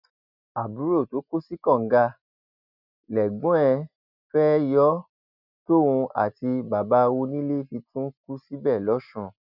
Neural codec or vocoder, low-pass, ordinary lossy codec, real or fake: none; 5.4 kHz; none; real